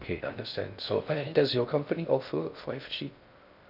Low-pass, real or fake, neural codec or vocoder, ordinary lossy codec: 5.4 kHz; fake; codec, 16 kHz in and 24 kHz out, 0.6 kbps, FocalCodec, streaming, 4096 codes; none